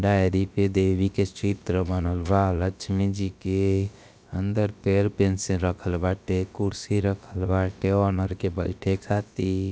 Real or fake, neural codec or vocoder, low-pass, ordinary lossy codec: fake; codec, 16 kHz, about 1 kbps, DyCAST, with the encoder's durations; none; none